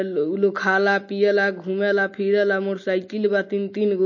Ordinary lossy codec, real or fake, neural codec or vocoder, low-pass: MP3, 32 kbps; real; none; 7.2 kHz